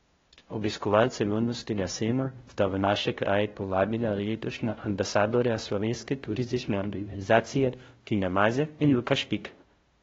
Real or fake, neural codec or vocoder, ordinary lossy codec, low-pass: fake; codec, 16 kHz, 0.5 kbps, FunCodec, trained on LibriTTS, 25 frames a second; AAC, 24 kbps; 7.2 kHz